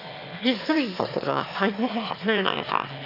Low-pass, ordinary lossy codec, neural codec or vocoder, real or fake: 5.4 kHz; none; autoencoder, 22.05 kHz, a latent of 192 numbers a frame, VITS, trained on one speaker; fake